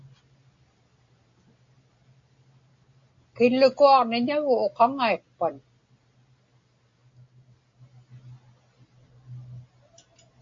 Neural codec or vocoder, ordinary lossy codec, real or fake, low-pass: none; AAC, 48 kbps; real; 7.2 kHz